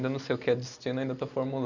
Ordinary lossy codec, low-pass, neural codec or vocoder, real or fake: none; 7.2 kHz; none; real